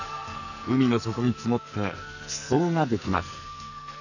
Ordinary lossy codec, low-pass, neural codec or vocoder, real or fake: none; 7.2 kHz; codec, 44.1 kHz, 2.6 kbps, SNAC; fake